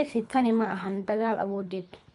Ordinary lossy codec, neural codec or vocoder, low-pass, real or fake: none; codec, 24 kHz, 3 kbps, HILCodec; 10.8 kHz; fake